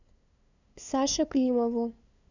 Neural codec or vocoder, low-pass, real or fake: codec, 16 kHz, 2 kbps, FunCodec, trained on LibriTTS, 25 frames a second; 7.2 kHz; fake